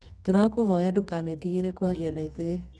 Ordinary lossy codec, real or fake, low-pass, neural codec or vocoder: none; fake; none; codec, 24 kHz, 0.9 kbps, WavTokenizer, medium music audio release